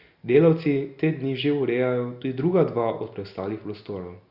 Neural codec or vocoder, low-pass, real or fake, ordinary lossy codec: none; 5.4 kHz; real; Opus, 64 kbps